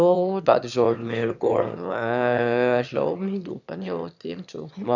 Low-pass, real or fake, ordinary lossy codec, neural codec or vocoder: 7.2 kHz; fake; none; autoencoder, 22.05 kHz, a latent of 192 numbers a frame, VITS, trained on one speaker